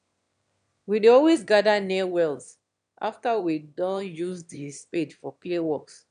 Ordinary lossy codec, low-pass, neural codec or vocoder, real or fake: none; 9.9 kHz; autoencoder, 22.05 kHz, a latent of 192 numbers a frame, VITS, trained on one speaker; fake